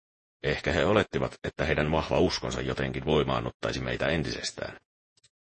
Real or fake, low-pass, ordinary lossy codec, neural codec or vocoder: fake; 10.8 kHz; MP3, 32 kbps; vocoder, 48 kHz, 128 mel bands, Vocos